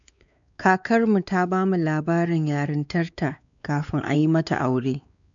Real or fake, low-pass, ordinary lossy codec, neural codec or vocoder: fake; 7.2 kHz; none; codec, 16 kHz, 4 kbps, X-Codec, WavLM features, trained on Multilingual LibriSpeech